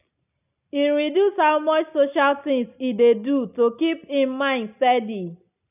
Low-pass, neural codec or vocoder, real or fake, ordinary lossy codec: 3.6 kHz; none; real; none